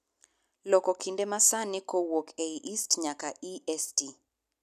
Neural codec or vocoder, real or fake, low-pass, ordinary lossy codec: none; real; 14.4 kHz; none